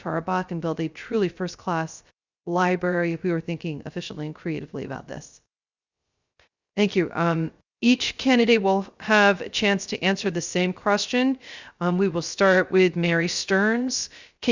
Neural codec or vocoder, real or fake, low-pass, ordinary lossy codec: codec, 16 kHz, 0.3 kbps, FocalCodec; fake; 7.2 kHz; Opus, 64 kbps